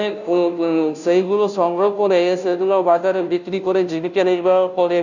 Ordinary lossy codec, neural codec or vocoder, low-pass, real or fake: none; codec, 16 kHz, 0.5 kbps, FunCodec, trained on Chinese and English, 25 frames a second; 7.2 kHz; fake